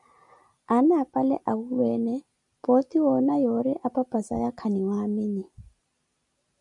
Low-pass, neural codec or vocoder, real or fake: 10.8 kHz; none; real